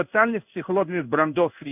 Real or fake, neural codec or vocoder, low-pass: fake; codec, 16 kHz in and 24 kHz out, 1 kbps, XY-Tokenizer; 3.6 kHz